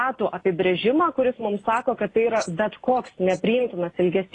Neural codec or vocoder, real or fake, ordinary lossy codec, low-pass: none; real; AAC, 32 kbps; 10.8 kHz